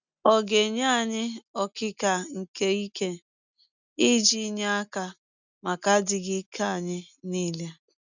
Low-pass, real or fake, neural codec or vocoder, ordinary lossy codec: 7.2 kHz; real; none; none